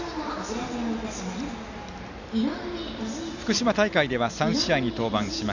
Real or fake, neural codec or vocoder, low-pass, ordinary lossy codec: real; none; 7.2 kHz; none